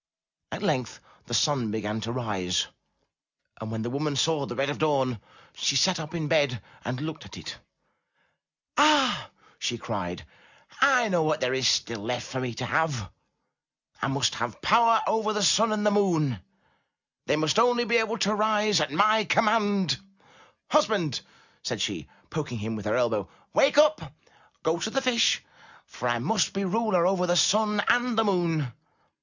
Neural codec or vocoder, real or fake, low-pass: none; real; 7.2 kHz